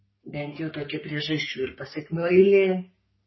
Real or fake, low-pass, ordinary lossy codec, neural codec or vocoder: fake; 7.2 kHz; MP3, 24 kbps; codec, 44.1 kHz, 3.4 kbps, Pupu-Codec